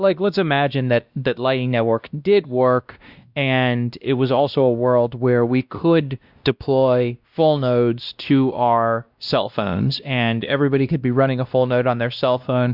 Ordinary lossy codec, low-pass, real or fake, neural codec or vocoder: Opus, 64 kbps; 5.4 kHz; fake; codec, 16 kHz, 1 kbps, X-Codec, WavLM features, trained on Multilingual LibriSpeech